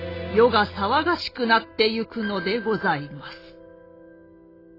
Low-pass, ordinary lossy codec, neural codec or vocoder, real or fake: 5.4 kHz; AAC, 24 kbps; none; real